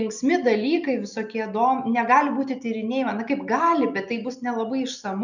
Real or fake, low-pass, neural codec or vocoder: real; 7.2 kHz; none